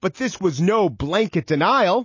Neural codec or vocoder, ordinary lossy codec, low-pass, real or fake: none; MP3, 32 kbps; 7.2 kHz; real